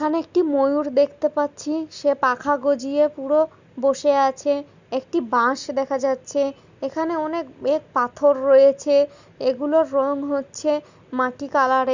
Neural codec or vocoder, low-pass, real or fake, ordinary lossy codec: none; 7.2 kHz; real; Opus, 64 kbps